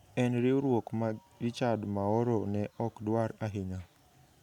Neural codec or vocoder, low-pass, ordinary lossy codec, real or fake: none; 19.8 kHz; none; real